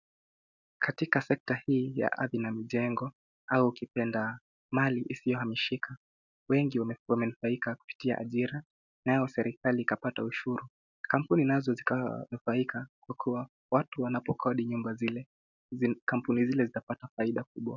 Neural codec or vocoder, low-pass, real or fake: none; 7.2 kHz; real